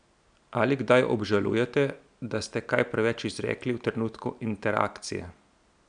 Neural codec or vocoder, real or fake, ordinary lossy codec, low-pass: none; real; none; 9.9 kHz